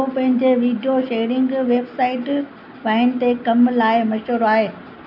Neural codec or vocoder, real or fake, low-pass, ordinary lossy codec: none; real; 5.4 kHz; none